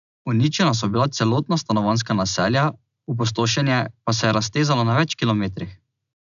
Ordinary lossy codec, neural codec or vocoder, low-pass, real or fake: none; none; 7.2 kHz; real